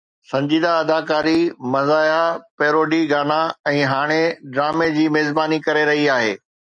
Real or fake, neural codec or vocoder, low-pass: real; none; 9.9 kHz